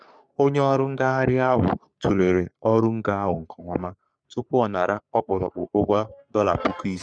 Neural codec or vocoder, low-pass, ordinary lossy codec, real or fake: codec, 44.1 kHz, 3.4 kbps, Pupu-Codec; 9.9 kHz; none; fake